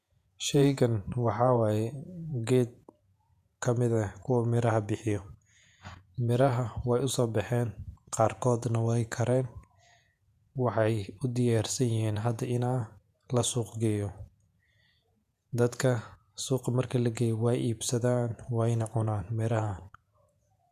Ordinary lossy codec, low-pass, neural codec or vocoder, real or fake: none; 14.4 kHz; vocoder, 48 kHz, 128 mel bands, Vocos; fake